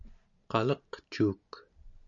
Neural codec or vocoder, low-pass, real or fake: none; 7.2 kHz; real